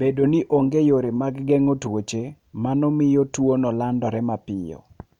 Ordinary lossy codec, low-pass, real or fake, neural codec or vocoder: none; 19.8 kHz; real; none